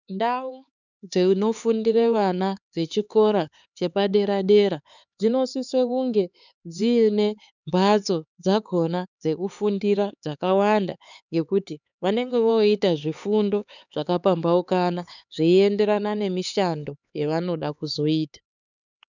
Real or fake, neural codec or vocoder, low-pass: fake; codec, 16 kHz, 4 kbps, X-Codec, HuBERT features, trained on LibriSpeech; 7.2 kHz